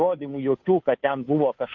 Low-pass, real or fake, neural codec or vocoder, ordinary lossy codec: 7.2 kHz; fake; codec, 16 kHz, 2 kbps, FunCodec, trained on Chinese and English, 25 frames a second; MP3, 48 kbps